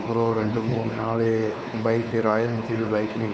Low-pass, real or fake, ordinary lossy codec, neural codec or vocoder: none; fake; none; codec, 16 kHz, 4 kbps, X-Codec, WavLM features, trained on Multilingual LibriSpeech